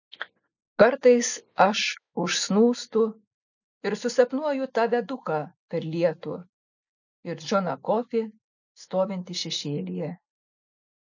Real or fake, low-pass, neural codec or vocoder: real; 7.2 kHz; none